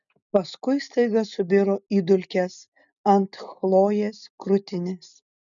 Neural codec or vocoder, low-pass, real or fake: none; 7.2 kHz; real